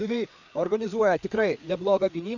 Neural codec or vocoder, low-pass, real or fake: codec, 16 kHz, 4 kbps, FreqCodec, larger model; 7.2 kHz; fake